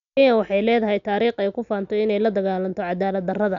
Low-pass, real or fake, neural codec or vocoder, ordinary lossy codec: 7.2 kHz; real; none; none